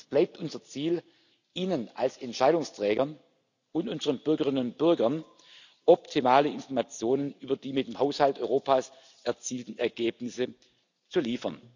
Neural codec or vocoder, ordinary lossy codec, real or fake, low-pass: none; none; real; 7.2 kHz